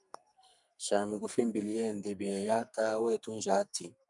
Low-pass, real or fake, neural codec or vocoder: 10.8 kHz; fake; codec, 44.1 kHz, 2.6 kbps, SNAC